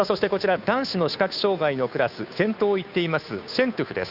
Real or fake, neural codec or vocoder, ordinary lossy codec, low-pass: fake; codec, 16 kHz, 2 kbps, FunCodec, trained on Chinese and English, 25 frames a second; none; 5.4 kHz